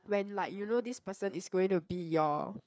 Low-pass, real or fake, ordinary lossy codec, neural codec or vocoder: none; fake; none; codec, 16 kHz, 4 kbps, FreqCodec, larger model